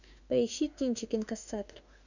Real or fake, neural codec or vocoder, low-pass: fake; autoencoder, 48 kHz, 32 numbers a frame, DAC-VAE, trained on Japanese speech; 7.2 kHz